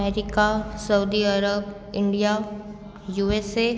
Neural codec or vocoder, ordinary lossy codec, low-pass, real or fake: none; none; none; real